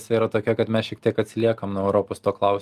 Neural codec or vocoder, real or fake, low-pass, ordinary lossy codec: none; real; 14.4 kHz; Opus, 32 kbps